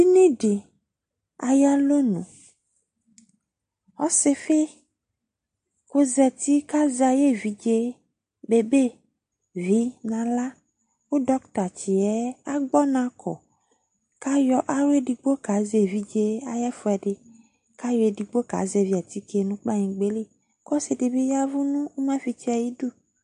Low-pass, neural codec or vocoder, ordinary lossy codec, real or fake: 9.9 kHz; none; AAC, 64 kbps; real